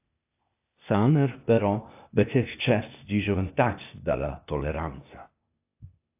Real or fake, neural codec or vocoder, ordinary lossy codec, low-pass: fake; codec, 16 kHz, 0.8 kbps, ZipCodec; AAC, 32 kbps; 3.6 kHz